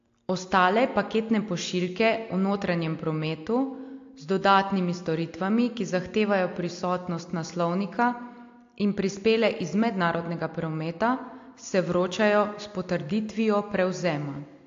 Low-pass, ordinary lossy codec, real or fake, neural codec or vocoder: 7.2 kHz; AAC, 48 kbps; real; none